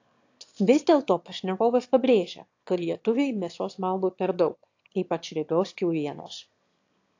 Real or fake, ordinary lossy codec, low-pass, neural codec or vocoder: fake; AAC, 48 kbps; 7.2 kHz; autoencoder, 22.05 kHz, a latent of 192 numbers a frame, VITS, trained on one speaker